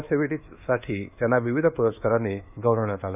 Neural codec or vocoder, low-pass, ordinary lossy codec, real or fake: codec, 24 kHz, 1.2 kbps, DualCodec; 3.6 kHz; none; fake